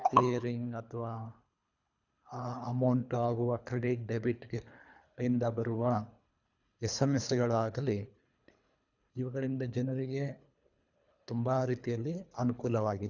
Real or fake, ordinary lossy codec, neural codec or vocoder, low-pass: fake; none; codec, 24 kHz, 3 kbps, HILCodec; 7.2 kHz